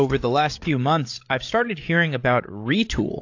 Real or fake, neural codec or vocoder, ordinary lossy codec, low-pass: fake; codec, 16 kHz, 8 kbps, FreqCodec, larger model; AAC, 48 kbps; 7.2 kHz